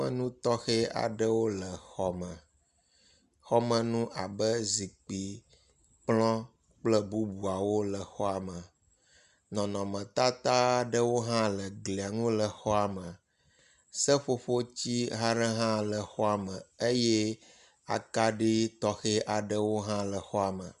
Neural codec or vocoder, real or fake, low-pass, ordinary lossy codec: none; real; 10.8 kHz; Opus, 64 kbps